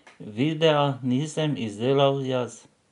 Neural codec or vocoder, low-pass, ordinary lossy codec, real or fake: none; 10.8 kHz; none; real